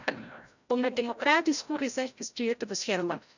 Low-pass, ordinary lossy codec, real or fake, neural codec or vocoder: 7.2 kHz; none; fake; codec, 16 kHz, 0.5 kbps, FreqCodec, larger model